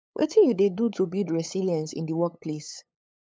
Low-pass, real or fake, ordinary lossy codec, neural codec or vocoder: none; fake; none; codec, 16 kHz, 8 kbps, FunCodec, trained on LibriTTS, 25 frames a second